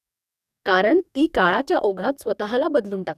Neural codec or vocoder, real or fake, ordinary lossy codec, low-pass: codec, 44.1 kHz, 2.6 kbps, DAC; fake; none; 14.4 kHz